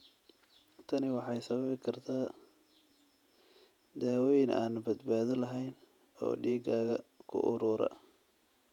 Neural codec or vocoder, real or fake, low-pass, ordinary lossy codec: vocoder, 48 kHz, 128 mel bands, Vocos; fake; 19.8 kHz; none